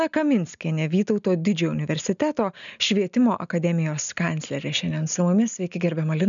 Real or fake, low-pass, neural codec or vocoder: real; 7.2 kHz; none